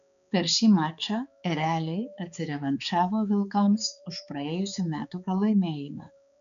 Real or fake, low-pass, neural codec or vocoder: fake; 7.2 kHz; codec, 16 kHz, 4 kbps, X-Codec, HuBERT features, trained on balanced general audio